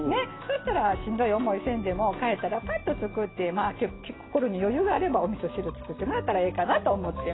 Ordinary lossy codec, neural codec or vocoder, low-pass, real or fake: AAC, 16 kbps; none; 7.2 kHz; real